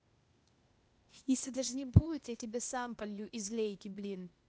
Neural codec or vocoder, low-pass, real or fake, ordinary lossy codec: codec, 16 kHz, 0.8 kbps, ZipCodec; none; fake; none